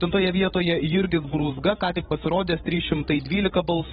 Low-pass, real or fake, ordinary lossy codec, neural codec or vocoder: 7.2 kHz; fake; AAC, 16 kbps; codec, 16 kHz, 16 kbps, FreqCodec, larger model